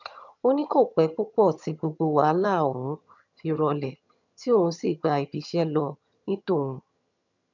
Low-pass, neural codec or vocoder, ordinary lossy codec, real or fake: 7.2 kHz; vocoder, 22.05 kHz, 80 mel bands, HiFi-GAN; none; fake